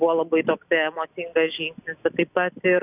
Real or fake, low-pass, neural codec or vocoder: real; 3.6 kHz; none